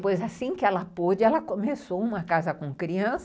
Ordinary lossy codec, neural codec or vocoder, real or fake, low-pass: none; none; real; none